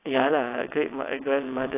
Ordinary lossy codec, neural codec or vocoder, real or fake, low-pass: AAC, 24 kbps; vocoder, 22.05 kHz, 80 mel bands, WaveNeXt; fake; 3.6 kHz